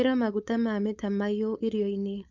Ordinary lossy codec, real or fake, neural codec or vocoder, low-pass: none; fake; codec, 16 kHz, 4.8 kbps, FACodec; 7.2 kHz